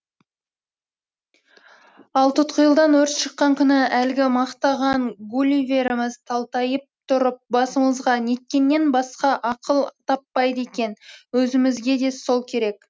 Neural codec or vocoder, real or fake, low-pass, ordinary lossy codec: none; real; none; none